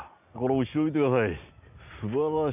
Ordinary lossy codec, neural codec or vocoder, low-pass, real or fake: none; none; 3.6 kHz; real